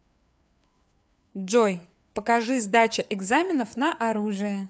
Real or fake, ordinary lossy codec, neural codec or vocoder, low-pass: fake; none; codec, 16 kHz, 4 kbps, FreqCodec, larger model; none